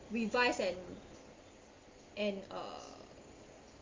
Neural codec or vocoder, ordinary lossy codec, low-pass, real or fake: none; Opus, 32 kbps; 7.2 kHz; real